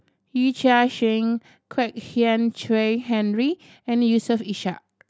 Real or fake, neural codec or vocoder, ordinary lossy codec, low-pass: real; none; none; none